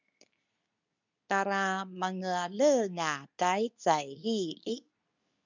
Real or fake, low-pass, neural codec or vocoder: fake; 7.2 kHz; codec, 24 kHz, 0.9 kbps, WavTokenizer, medium speech release version 2